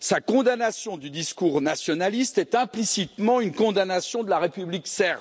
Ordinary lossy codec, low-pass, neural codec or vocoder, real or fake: none; none; none; real